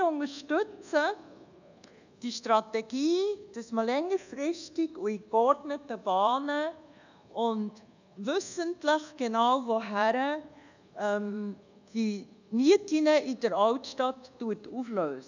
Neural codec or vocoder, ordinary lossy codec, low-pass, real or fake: codec, 24 kHz, 1.2 kbps, DualCodec; none; 7.2 kHz; fake